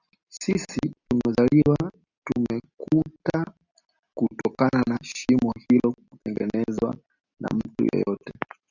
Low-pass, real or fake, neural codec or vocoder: 7.2 kHz; real; none